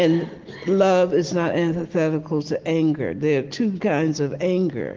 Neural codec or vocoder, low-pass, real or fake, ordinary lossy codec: none; 7.2 kHz; real; Opus, 16 kbps